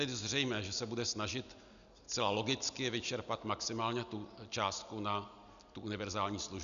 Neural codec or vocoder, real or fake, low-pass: none; real; 7.2 kHz